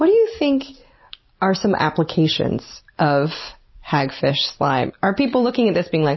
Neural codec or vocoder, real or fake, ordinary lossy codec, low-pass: none; real; MP3, 24 kbps; 7.2 kHz